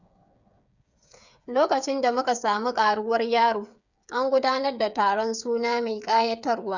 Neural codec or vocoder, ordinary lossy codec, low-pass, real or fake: codec, 16 kHz, 8 kbps, FreqCodec, smaller model; none; 7.2 kHz; fake